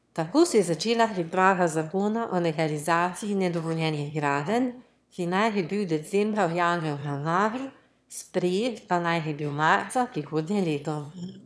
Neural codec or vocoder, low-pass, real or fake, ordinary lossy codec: autoencoder, 22.05 kHz, a latent of 192 numbers a frame, VITS, trained on one speaker; none; fake; none